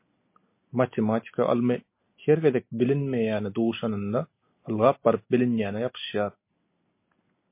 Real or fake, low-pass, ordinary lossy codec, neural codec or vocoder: real; 3.6 kHz; MP3, 24 kbps; none